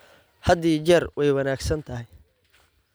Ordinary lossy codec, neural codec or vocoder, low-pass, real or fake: none; none; none; real